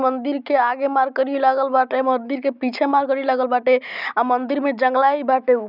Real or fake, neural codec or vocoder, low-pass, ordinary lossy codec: real; none; 5.4 kHz; none